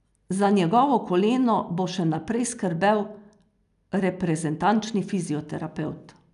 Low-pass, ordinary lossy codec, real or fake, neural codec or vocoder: 10.8 kHz; none; real; none